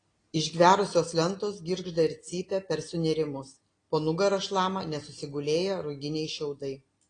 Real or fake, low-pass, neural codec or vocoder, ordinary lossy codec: real; 9.9 kHz; none; AAC, 32 kbps